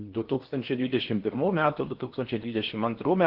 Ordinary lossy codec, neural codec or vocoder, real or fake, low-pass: Opus, 16 kbps; codec, 16 kHz in and 24 kHz out, 0.8 kbps, FocalCodec, streaming, 65536 codes; fake; 5.4 kHz